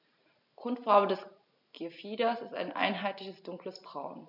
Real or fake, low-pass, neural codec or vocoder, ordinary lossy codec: real; 5.4 kHz; none; none